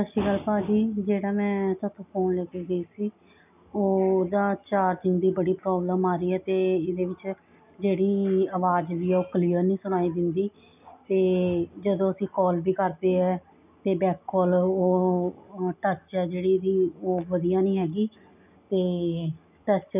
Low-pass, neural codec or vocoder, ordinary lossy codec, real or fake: 3.6 kHz; none; none; real